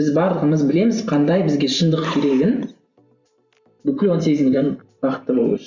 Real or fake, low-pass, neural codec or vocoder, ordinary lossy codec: fake; 7.2 kHz; vocoder, 44.1 kHz, 128 mel bands every 512 samples, BigVGAN v2; Opus, 64 kbps